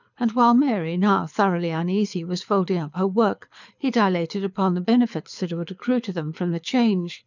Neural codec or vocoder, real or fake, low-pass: codec, 24 kHz, 6 kbps, HILCodec; fake; 7.2 kHz